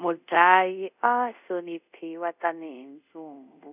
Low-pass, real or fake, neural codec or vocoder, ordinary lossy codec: 3.6 kHz; fake; codec, 24 kHz, 0.5 kbps, DualCodec; none